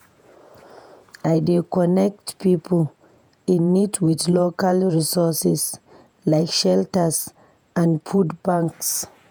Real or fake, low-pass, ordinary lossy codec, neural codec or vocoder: fake; none; none; vocoder, 48 kHz, 128 mel bands, Vocos